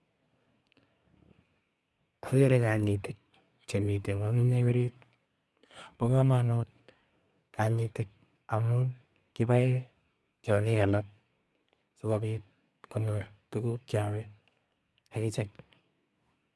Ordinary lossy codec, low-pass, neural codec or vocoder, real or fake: none; none; codec, 24 kHz, 1 kbps, SNAC; fake